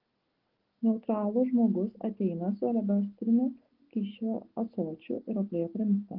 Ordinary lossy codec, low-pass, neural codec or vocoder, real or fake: Opus, 16 kbps; 5.4 kHz; none; real